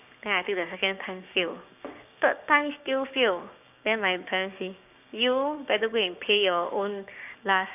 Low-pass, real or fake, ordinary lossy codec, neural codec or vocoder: 3.6 kHz; fake; none; codec, 16 kHz, 6 kbps, DAC